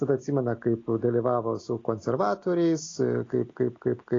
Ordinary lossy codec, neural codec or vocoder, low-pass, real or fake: AAC, 32 kbps; none; 7.2 kHz; real